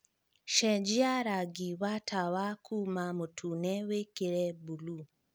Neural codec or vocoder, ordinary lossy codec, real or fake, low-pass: none; none; real; none